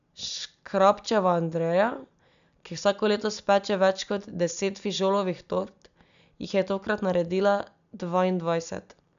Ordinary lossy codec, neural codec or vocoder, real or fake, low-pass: AAC, 96 kbps; none; real; 7.2 kHz